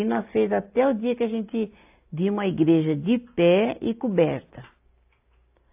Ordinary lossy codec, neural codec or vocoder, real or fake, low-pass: AAC, 32 kbps; none; real; 3.6 kHz